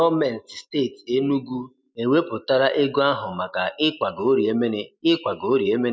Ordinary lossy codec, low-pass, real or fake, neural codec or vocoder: none; none; real; none